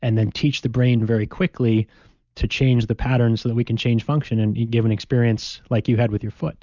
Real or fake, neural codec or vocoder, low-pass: real; none; 7.2 kHz